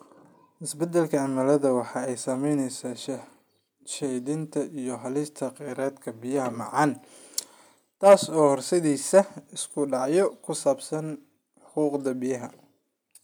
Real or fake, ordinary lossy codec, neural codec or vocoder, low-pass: real; none; none; none